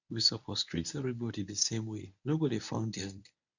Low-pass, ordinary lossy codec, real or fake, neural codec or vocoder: 7.2 kHz; none; fake; codec, 24 kHz, 0.9 kbps, WavTokenizer, medium speech release version 1